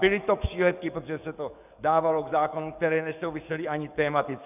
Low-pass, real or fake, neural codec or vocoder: 3.6 kHz; fake; codec, 44.1 kHz, 7.8 kbps, DAC